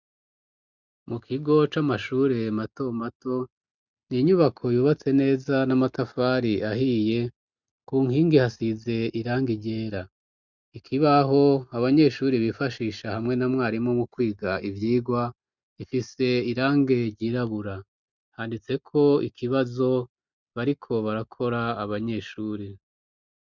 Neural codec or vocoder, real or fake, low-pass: none; real; 7.2 kHz